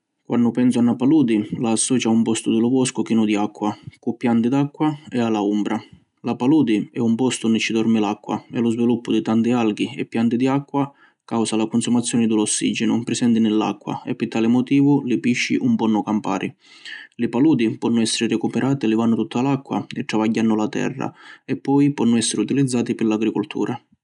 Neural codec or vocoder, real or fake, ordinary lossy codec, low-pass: none; real; none; 10.8 kHz